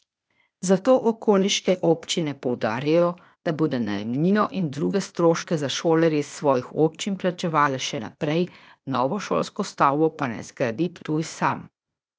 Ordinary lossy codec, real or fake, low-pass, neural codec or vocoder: none; fake; none; codec, 16 kHz, 0.8 kbps, ZipCodec